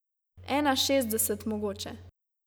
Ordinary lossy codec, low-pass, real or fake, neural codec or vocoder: none; none; real; none